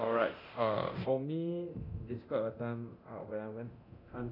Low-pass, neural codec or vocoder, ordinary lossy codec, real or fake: 5.4 kHz; codec, 24 kHz, 0.9 kbps, DualCodec; none; fake